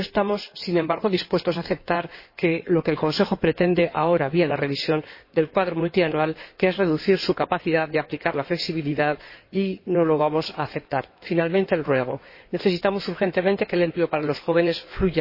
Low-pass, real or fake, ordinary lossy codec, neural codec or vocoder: 5.4 kHz; fake; MP3, 24 kbps; codec, 16 kHz in and 24 kHz out, 2.2 kbps, FireRedTTS-2 codec